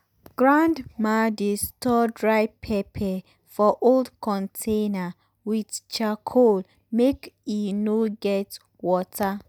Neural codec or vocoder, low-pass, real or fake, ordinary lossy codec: none; none; real; none